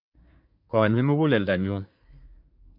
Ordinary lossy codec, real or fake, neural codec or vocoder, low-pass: none; fake; codec, 24 kHz, 1 kbps, SNAC; 5.4 kHz